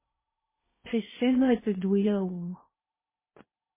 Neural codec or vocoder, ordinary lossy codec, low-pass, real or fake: codec, 16 kHz in and 24 kHz out, 0.8 kbps, FocalCodec, streaming, 65536 codes; MP3, 16 kbps; 3.6 kHz; fake